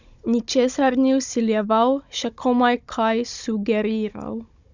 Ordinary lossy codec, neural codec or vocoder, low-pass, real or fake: Opus, 64 kbps; codec, 16 kHz, 16 kbps, FunCodec, trained on Chinese and English, 50 frames a second; 7.2 kHz; fake